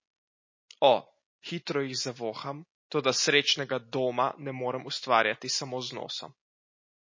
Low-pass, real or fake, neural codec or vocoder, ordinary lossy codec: 7.2 kHz; real; none; MP3, 32 kbps